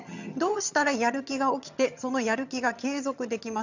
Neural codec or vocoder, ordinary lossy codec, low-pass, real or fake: vocoder, 22.05 kHz, 80 mel bands, HiFi-GAN; none; 7.2 kHz; fake